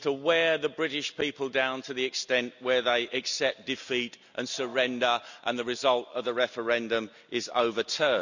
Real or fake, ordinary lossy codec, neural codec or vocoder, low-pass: real; none; none; 7.2 kHz